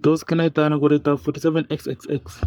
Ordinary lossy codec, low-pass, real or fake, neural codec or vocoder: none; none; fake; codec, 44.1 kHz, 3.4 kbps, Pupu-Codec